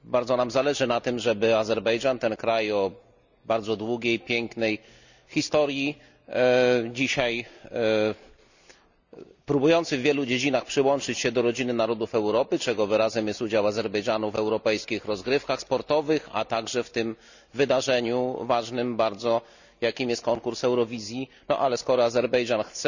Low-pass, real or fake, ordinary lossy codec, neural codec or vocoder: 7.2 kHz; real; none; none